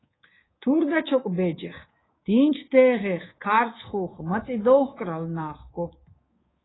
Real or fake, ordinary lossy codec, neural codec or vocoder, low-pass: fake; AAC, 16 kbps; codec, 24 kHz, 3.1 kbps, DualCodec; 7.2 kHz